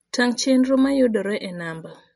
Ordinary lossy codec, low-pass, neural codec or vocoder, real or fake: MP3, 48 kbps; 19.8 kHz; none; real